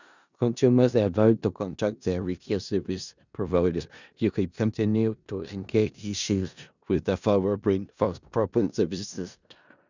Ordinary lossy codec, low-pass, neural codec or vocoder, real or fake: none; 7.2 kHz; codec, 16 kHz in and 24 kHz out, 0.4 kbps, LongCat-Audio-Codec, four codebook decoder; fake